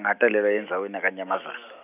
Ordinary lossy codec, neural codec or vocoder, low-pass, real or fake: none; none; 3.6 kHz; real